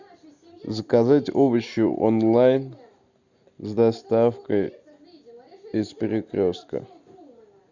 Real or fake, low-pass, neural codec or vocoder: real; 7.2 kHz; none